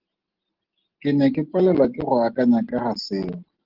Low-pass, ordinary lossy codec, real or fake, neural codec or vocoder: 5.4 kHz; Opus, 16 kbps; real; none